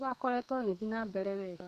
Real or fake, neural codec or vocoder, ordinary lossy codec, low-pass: fake; codec, 44.1 kHz, 2.6 kbps, SNAC; none; 14.4 kHz